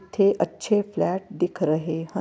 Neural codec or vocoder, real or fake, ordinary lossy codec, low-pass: none; real; none; none